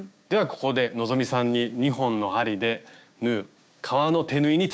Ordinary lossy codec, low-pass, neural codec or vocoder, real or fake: none; none; codec, 16 kHz, 6 kbps, DAC; fake